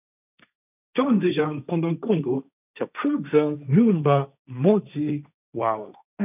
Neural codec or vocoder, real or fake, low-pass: codec, 16 kHz, 1.1 kbps, Voila-Tokenizer; fake; 3.6 kHz